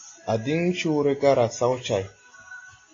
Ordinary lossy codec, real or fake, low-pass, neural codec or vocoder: AAC, 32 kbps; real; 7.2 kHz; none